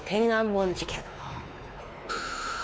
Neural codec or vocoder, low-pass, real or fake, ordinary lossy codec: codec, 16 kHz, 2 kbps, X-Codec, WavLM features, trained on Multilingual LibriSpeech; none; fake; none